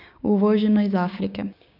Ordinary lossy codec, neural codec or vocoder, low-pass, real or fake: none; codec, 16 kHz, 6 kbps, DAC; 5.4 kHz; fake